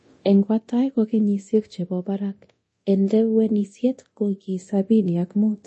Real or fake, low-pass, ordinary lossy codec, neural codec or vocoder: fake; 10.8 kHz; MP3, 32 kbps; codec, 24 kHz, 0.9 kbps, DualCodec